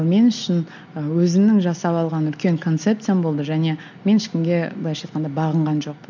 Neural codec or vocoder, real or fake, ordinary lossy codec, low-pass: none; real; none; 7.2 kHz